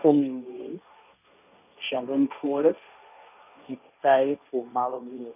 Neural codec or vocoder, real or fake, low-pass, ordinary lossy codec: codec, 16 kHz, 1.1 kbps, Voila-Tokenizer; fake; 3.6 kHz; none